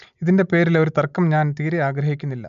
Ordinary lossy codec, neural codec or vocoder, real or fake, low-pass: AAC, 96 kbps; none; real; 7.2 kHz